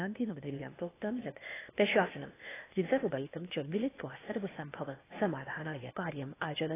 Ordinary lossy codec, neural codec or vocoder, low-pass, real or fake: AAC, 16 kbps; codec, 16 kHz, 0.8 kbps, ZipCodec; 3.6 kHz; fake